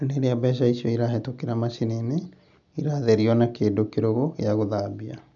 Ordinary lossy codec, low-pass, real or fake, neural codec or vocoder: MP3, 96 kbps; 7.2 kHz; real; none